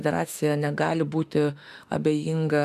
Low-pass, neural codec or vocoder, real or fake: 14.4 kHz; autoencoder, 48 kHz, 32 numbers a frame, DAC-VAE, trained on Japanese speech; fake